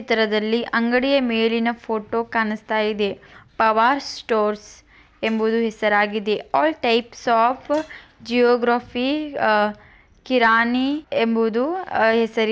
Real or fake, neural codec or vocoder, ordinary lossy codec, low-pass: real; none; none; none